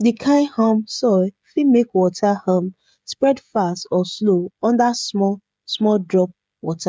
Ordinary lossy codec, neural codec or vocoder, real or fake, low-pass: none; codec, 16 kHz, 16 kbps, FreqCodec, smaller model; fake; none